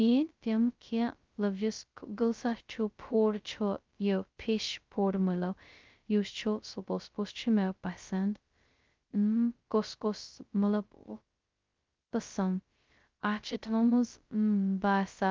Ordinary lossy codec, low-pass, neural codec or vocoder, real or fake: Opus, 32 kbps; 7.2 kHz; codec, 16 kHz, 0.2 kbps, FocalCodec; fake